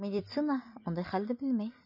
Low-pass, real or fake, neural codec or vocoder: 5.4 kHz; real; none